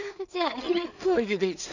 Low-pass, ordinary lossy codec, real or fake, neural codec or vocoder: 7.2 kHz; none; fake; codec, 16 kHz in and 24 kHz out, 0.4 kbps, LongCat-Audio-Codec, two codebook decoder